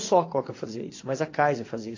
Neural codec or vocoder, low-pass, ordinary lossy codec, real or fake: vocoder, 22.05 kHz, 80 mel bands, WaveNeXt; 7.2 kHz; AAC, 32 kbps; fake